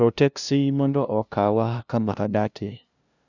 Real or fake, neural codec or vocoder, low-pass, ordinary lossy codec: fake; codec, 16 kHz, 0.5 kbps, FunCodec, trained on LibriTTS, 25 frames a second; 7.2 kHz; none